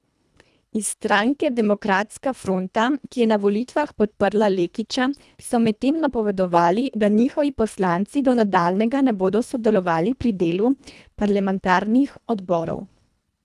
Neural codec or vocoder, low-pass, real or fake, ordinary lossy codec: codec, 24 kHz, 1.5 kbps, HILCodec; none; fake; none